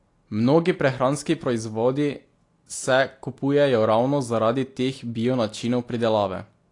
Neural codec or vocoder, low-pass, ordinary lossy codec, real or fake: none; 10.8 kHz; AAC, 48 kbps; real